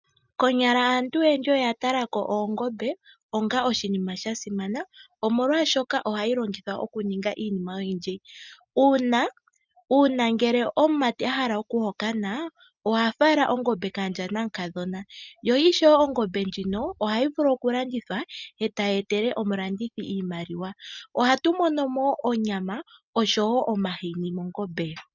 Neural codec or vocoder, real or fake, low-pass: none; real; 7.2 kHz